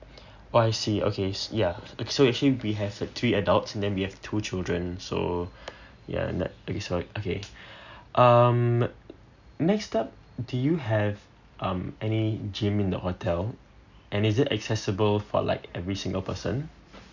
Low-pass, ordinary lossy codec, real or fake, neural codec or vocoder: 7.2 kHz; none; real; none